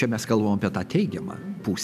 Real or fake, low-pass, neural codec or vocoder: real; 14.4 kHz; none